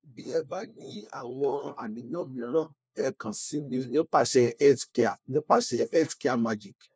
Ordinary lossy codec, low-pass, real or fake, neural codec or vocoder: none; none; fake; codec, 16 kHz, 1 kbps, FunCodec, trained on LibriTTS, 50 frames a second